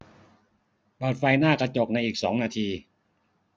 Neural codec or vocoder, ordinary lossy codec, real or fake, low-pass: none; none; real; none